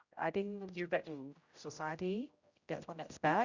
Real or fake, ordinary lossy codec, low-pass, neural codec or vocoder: fake; Opus, 64 kbps; 7.2 kHz; codec, 16 kHz, 0.5 kbps, X-Codec, HuBERT features, trained on general audio